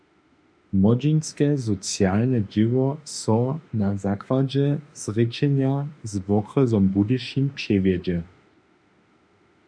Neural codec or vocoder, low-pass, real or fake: autoencoder, 48 kHz, 32 numbers a frame, DAC-VAE, trained on Japanese speech; 9.9 kHz; fake